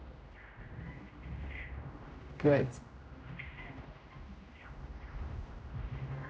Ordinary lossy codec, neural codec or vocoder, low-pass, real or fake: none; codec, 16 kHz, 0.5 kbps, X-Codec, HuBERT features, trained on general audio; none; fake